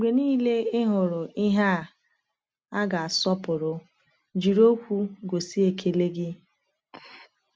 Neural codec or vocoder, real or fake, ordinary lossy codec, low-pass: none; real; none; none